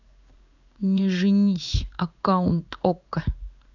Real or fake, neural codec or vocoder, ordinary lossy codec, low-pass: fake; codec, 16 kHz in and 24 kHz out, 1 kbps, XY-Tokenizer; none; 7.2 kHz